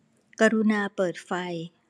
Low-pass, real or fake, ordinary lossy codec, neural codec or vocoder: none; real; none; none